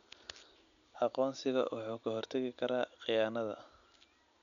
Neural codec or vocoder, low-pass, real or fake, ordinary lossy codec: none; 7.2 kHz; real; none